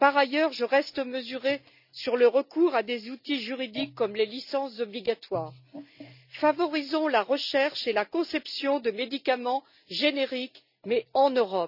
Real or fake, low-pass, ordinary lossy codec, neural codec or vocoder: real; 5.4 kHz; none; none